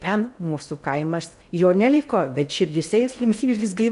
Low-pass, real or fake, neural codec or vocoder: 10.8 kHz; fake; codec, 16 kHz in and 24 kHz out, 0.6 kbps, FocalCodec, streaming, 4096 codes